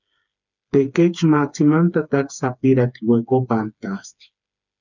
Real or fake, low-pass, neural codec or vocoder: fake; 7.2 kHz; codec, 16 kHz, 4 kbps, FreqCodec, smaller model